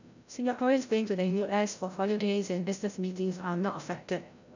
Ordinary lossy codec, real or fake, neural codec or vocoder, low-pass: none; fake; codec, 16 kHz, 0.5 kbps, FreqCodec, larger model; 7.2 kHz